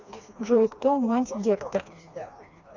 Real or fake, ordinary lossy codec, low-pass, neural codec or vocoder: fake; Opus, 64 kbps; 7.2 kHz; codec, 16 kHz, 2 kbps, FreqCodec, smaller model